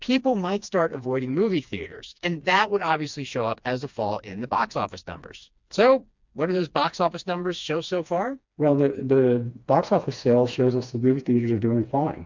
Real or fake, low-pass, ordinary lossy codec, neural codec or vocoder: fake; 7.2 kHz; MP3, 64 kbps; codec, 16 kHz, 2 kbps, FreqCodec, smaller model